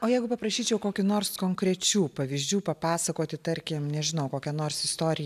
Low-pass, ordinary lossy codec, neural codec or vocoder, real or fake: 14.4 kHz; MP3, 96 kbps; none; real